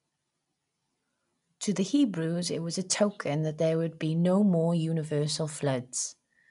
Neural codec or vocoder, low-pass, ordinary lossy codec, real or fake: none; 10.8 kHz; none; real